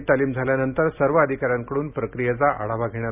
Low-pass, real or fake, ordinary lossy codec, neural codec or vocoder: 3.6 kHz; real; none; none